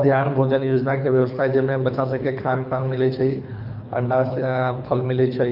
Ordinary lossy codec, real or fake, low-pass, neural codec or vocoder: none; fake; 5.4 kHz; codec, 24 kHz, 3 kbps, HILCodec